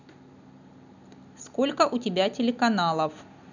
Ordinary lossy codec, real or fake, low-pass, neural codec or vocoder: none; real; 7.2 kHz; none